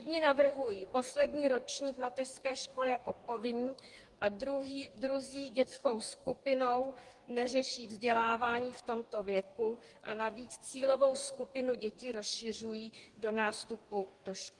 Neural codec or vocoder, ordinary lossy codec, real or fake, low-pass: codec, 44.1 kHz, 2.6 kbps, DAC; Opus, 24 kbps; fake; 10.8 kHz